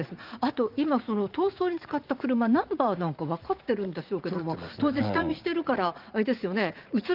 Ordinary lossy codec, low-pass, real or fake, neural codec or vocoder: Opus, 32 kbps; 5.4 kHz; fake; vocoder, 44.1 kHz, 80 mel bands, Vocos